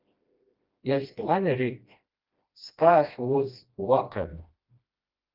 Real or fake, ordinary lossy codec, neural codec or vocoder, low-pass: fake; Opus, 24 kbps; codec, 16 kHz, 1 kbps, FreqCodec, smaller model; 5.4 kHz